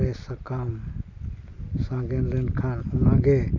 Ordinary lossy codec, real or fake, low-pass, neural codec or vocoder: none; real; 7.2 kHz; none